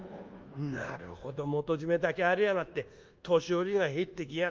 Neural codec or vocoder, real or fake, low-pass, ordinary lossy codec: codec, 24 kHz, 1.2 kbps, DualCodec; fake; 7.2 kHz; Opus, 24 kbps